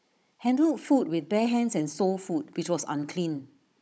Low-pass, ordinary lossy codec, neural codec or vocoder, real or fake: none; none; codec, 16 kHz, 16 kbps, FunCodec, trained on Chinese and English, 50 frames a second; fake